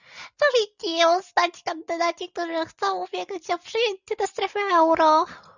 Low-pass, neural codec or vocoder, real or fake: 7.2 kHz; none; real